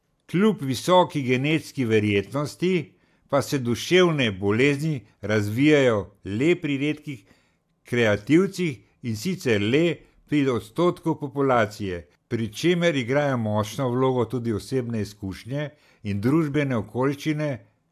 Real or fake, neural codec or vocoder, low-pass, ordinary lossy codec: real; none; 14.4 kHz; AAC, 96 kbps